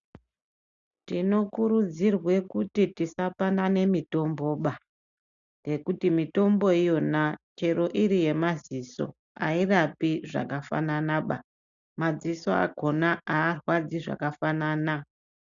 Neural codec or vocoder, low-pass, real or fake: none; 7.2 kHz; real